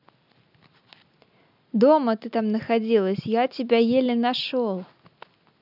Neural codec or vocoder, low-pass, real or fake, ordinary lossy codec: none; 5.4 kHz; real; none